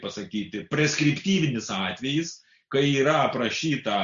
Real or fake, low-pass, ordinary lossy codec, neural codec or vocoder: real; 7.2 kHz; Opus, 64 kbps; none